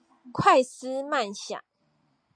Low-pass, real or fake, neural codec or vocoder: 9.9 kHz; real; none